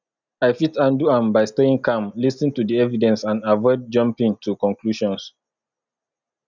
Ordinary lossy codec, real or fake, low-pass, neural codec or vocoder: none; real; 7.2 kHz; none